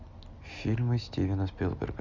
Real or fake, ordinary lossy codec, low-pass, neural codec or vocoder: real; Opus, 64 kbps; 7.2 kHz; none